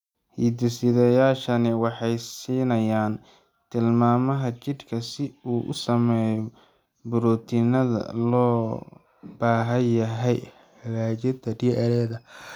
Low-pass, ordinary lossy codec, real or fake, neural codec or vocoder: 19.8 kHz; none; real; none